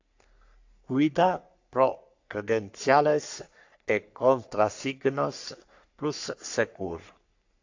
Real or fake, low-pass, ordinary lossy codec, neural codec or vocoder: fake; 7.2 kHz; AAC, 48 kbps; codec, 44.1 kHz, 3.4 kbps, Pupu-Codec